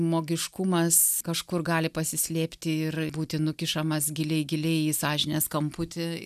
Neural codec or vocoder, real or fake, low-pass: none; real; 14.4 kHz